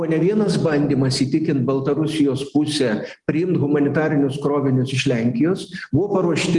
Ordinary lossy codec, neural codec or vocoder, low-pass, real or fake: Opus, 24 kbps; none; 10.8 kHz; real